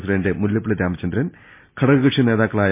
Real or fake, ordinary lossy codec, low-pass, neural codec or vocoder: real; MP3, 24 kbps; 3.6 kHz; none